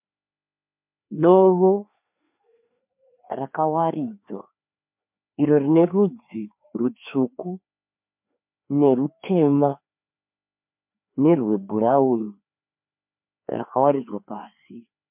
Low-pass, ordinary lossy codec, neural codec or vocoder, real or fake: 3.6 kHz; MP3, 32 kbps; codec, 16 kHz, 2 kbps, FreqCodec, larger model; fake